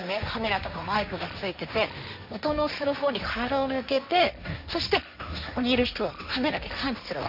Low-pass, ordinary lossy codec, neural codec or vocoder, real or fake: 5.4 kHz; none; codec, 16 kHz, 1.1 kbps, Voila-Tokenizer; fake